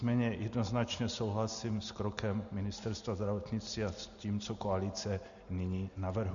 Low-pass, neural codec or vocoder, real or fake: 7.2 kHz; none; real